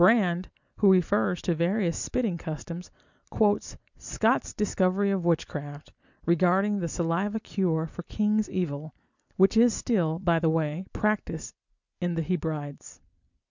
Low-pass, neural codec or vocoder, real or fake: 7.2 kHz; none; real